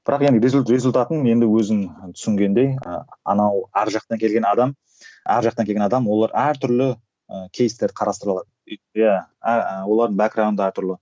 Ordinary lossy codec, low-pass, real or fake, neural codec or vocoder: none; none; real; none